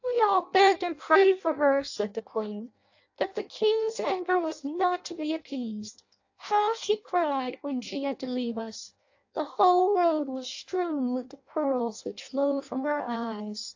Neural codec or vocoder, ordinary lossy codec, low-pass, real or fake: codec, 16 kHz in and 24 kHz out, 0.6 kbps, FireRedTTS-2 codec; AAC, 48 kbps; 7.2 kHz; fake